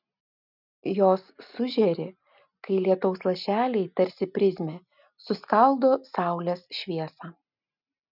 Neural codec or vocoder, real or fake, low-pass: none; real; 5.4 kHz